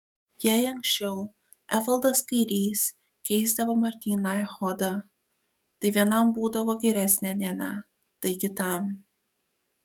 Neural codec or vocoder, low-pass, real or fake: codec, 44.1 kHz, 7.8 kbps, DAC; 19.8 kHz; fake